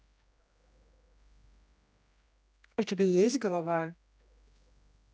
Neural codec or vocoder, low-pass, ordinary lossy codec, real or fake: codec, 16 kHz, 1 kbps, X-Codec, HuBERT features, trained on general audio; none; none; fake